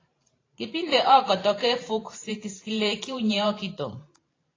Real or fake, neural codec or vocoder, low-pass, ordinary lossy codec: fake; vocoder, 44.1 kHz, 128 mel bands every 512 samples, BigVGAN v2; 7.2 kHz; AAC, 32 kbps